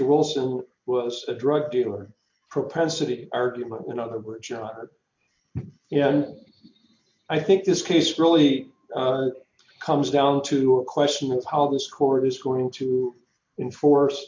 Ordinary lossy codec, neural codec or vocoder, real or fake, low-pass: MP3, 48 kbps; none; real; 7.2 kHz